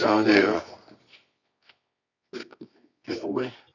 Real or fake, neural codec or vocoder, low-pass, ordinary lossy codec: fake; codec, 24 kHz, 0.9 kbps, WavTokenizer, medium music audio release; 7.2 kHz; none